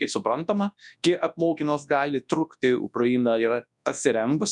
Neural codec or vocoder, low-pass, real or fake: codec, 24 kHz, 0.9 kbps, WavTokenizer, large speech release; 10.8 kHz; fake